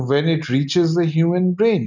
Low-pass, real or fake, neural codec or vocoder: 7.2 kHz; real; none